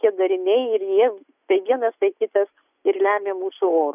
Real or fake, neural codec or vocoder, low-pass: real; none; 3.6 kHz